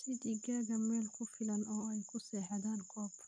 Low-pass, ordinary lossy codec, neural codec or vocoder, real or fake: 9.9 kHz; none; none; real